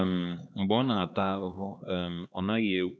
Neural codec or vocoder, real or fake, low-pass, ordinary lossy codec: codec, 16 kHz, 2 kbps, X-Codec, HuBERT features, trained on LibriSpeech; fake; none; none